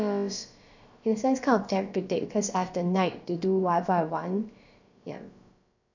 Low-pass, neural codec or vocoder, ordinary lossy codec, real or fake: 7.2 kHz; codec, 16 kHz, about 1 kbps, DyCAST, with the encoder's durations; none; fake